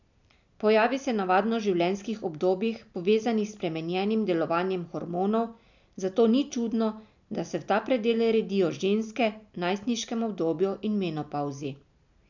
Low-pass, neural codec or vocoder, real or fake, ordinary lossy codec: 7.2 kHz; none; real; none